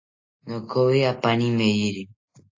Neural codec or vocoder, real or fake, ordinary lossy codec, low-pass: none; real; AAC, 48 kbps; 7.2 kHz